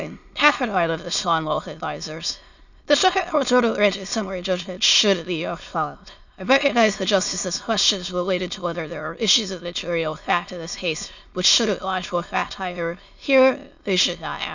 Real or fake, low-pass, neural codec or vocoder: fake; 7.2 kHz; autoencoder, 22.05 kHz, a latent of 192 numbers a frame, VITS, trained on many speakers